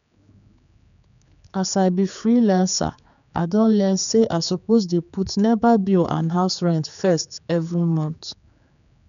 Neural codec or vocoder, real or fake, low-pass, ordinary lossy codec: codec, 16 kHz, 4 kbps, X-Codec, HuBERT features, trained on general audio; fake; 7.2 kHz; none